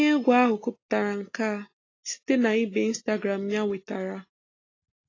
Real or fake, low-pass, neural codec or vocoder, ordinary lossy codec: real; 7.2 kHz; none; AAC, 32 kbps